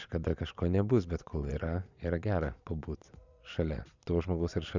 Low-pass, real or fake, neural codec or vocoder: 7.2 kHz; fake; vocoder, 22.05 kHz, 80 mel bands, Vocos